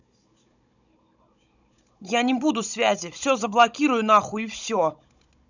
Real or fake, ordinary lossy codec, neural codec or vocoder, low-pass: fake; none; codec, 16 kHz, 16 kbps, FunCodec, trained on Chinese and English, 50 frames a second; 7.2 kHz